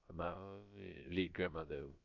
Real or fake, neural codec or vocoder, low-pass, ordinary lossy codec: fake; codec, 16 kHz, about 1 kbps, DyCAST, with the encoder's durations; 7.2 kHz; MP3, 48 kbps